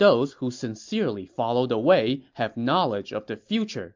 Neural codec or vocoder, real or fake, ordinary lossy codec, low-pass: none; real; MP3, 64 kbps; 7.2 kHz